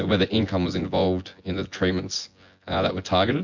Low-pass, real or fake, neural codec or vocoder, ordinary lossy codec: 7.2 kHz; fake; vocoder, 24 kHz, 100 mel bands, Vocos; MP3, 48 kbps